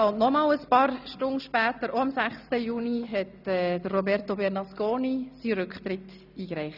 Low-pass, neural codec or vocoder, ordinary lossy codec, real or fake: 5.4 kHz; none; none; real